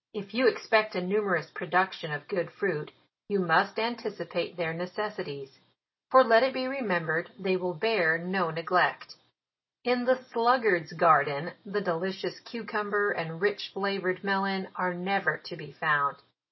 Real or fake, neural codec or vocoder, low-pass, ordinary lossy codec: real; none; 7.2 kHz; MP3, 24 kbps